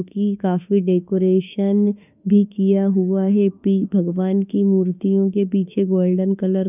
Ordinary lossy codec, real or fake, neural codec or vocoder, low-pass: none; fake; autoencoder, 48 kHz, 32 numbers a frame, DAC-VAE, trained on Japanese speech; 3.6 kHz